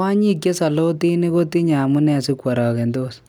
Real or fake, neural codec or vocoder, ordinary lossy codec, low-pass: real; none; none; 19.8 kHz